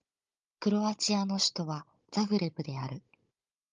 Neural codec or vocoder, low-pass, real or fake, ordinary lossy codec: codec, 16 kHz, 16 kbps, FunCodec, trained on Chinese and English, 50 frames a second; 7.2 kHz; fake; Opus, 32 kbps